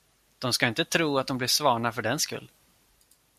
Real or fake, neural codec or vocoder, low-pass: real; none; 14.4 kHz